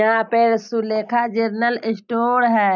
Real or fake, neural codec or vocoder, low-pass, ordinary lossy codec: real; none; 7.2 kHz; none